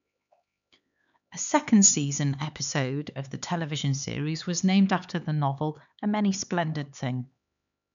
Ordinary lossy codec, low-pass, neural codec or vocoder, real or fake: none; 7.2 kHz; codec, 16 kHz, 4 kbps, X-Codec, HuBERT features, trained on LibriSpeech; fake